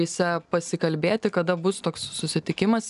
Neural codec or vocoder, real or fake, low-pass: none; real; 10.8 kHz